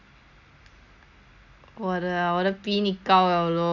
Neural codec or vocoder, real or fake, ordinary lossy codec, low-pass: none; real; none; 7.2 kHz